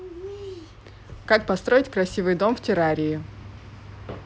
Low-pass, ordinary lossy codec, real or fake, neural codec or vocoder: none; none; real; none